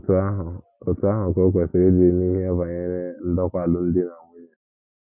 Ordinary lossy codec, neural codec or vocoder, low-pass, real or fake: none; none; 3.6 kHz; real